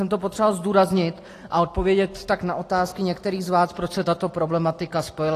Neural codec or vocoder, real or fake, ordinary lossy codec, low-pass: none; real; AAC, 48 kbps; 14.4 kHz